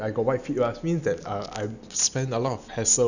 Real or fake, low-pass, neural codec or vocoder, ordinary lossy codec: real; 7.2 kHz; none; none